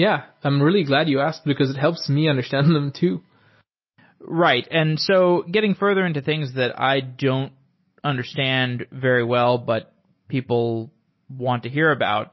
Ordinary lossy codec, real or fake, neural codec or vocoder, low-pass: MP3, 24 kbps; real; none; 7.2 kHz